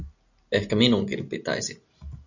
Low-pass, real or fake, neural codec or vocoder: 7.2 kHz; real; none